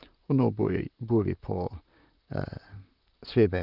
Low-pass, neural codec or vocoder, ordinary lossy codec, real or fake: 5.4 kHz; vocoder, 44.1 kHz, 128 mel bands, Pupu-Vocoder; Opus, 24 kbps; fake